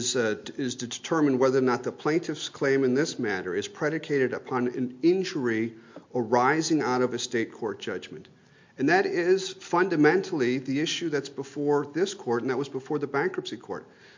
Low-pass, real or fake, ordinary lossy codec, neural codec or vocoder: 7.2 kHz; real; MP3, 48 kbps; none